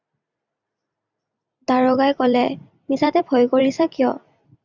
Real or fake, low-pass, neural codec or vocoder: fake; 7.2 kHz; vocoder, 44.1 kHz, 128 mel bands every 256 samples, BigVGAN v2